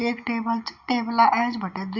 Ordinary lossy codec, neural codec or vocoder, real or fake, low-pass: Opus, 64 kbps; none; real; 7.2 kHz